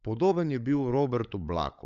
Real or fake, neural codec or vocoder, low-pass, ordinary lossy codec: fake; codec, 16 kHz, 16 kbps, FunCodec, trained on LibriTTS, 50 frames a second; 7.2 kHz; MP3, 96 kbps